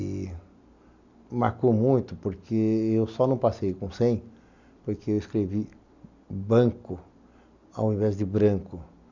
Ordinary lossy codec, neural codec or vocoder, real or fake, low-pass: none; none; real; 7.2 kHz